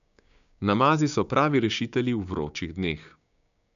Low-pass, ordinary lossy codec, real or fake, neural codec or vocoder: 7.2 kHz; none; fake; codec, 16 kHz, 6 kbps, DAC